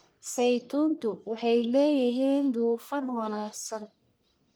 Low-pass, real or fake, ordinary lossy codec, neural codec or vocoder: none; fake; none; codec, 44.1 kHz, 1.7 kbps, Pupu-Codec